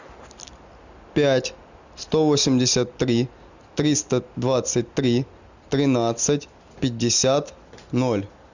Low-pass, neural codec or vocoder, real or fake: 7.2 kHz; none; real